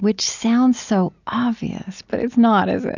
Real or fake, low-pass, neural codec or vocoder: real; 7.2 kHz; none